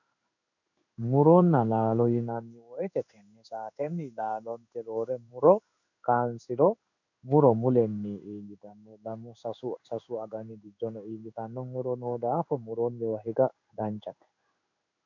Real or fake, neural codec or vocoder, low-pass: fake; codec, 16 kHz in and 24 kHz out, 1 kbps, XY-Tokenizer; 7.2 kHz